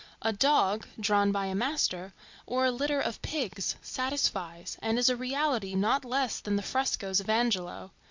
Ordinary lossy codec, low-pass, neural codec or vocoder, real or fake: MP3, 64 kbps; 7.2 kHz; none; real